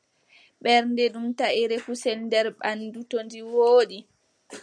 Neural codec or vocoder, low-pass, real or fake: none; 9.9 kHz; real